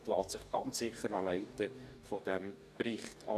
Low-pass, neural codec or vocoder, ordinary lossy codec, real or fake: 14.4 kHz; codec, 44.1 kHz, 2.6 kbps, DAC; none; fake